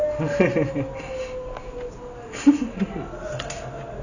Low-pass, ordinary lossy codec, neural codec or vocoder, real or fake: 7.2 kHz; none; none; real